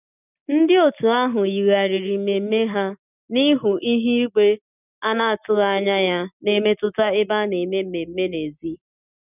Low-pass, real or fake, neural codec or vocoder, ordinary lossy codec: 3.6 kHz; real; none; none